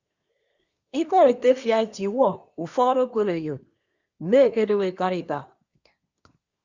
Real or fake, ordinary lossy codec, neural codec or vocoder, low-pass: fake; Opus, 64 kbps; codec, 24 kHz, 1 kbps, SNAC; 7.2 kHz